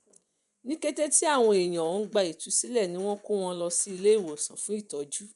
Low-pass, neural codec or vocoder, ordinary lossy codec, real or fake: 10.8 kHz; vocoder, 24 kHz, 100 mel bands, Vocos; none; fake